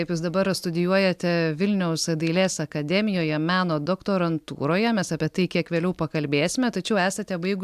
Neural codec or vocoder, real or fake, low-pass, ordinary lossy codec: none; real; 14.4 kHz; AAC, 96 kbps